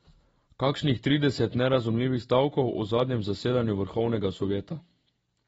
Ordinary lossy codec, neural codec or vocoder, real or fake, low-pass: AAC, 24 kbps; none; real; 14.4 kHz